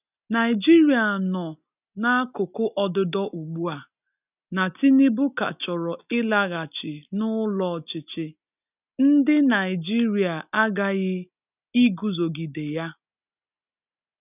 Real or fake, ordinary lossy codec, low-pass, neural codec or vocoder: real; none; 3.6 kHz; none